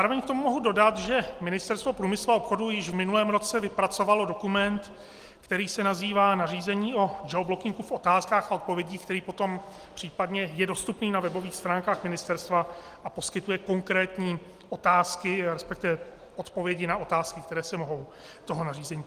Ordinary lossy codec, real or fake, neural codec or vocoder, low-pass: Opus, 24 kbps; real; none; 14.4 kHz